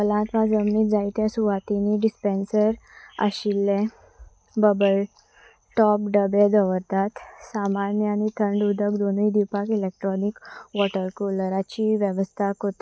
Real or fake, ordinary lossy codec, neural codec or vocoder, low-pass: real; none; none; none